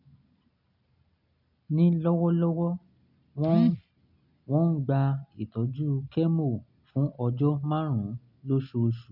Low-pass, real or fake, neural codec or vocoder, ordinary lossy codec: 5.4 kHz; real; none; none